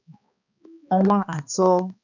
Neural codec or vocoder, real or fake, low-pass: codec, 16 kHz, 2 kbps, X-Codec, HuBERT features, trained on balanced general audio; fake; 7.2 kHz